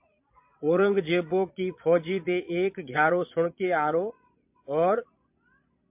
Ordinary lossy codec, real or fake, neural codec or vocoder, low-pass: MP3, 32 kbps; fake; vocoder, 22.05 kHz, 80 mel bands, Vocos; 3.6 kHz